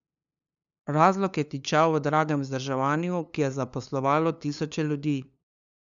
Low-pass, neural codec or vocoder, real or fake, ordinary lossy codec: 7.2 kHz; codec, 16 kHz, 2 kbps, FunCodec, trained on LibriTTS, 25 frames a second; fake; none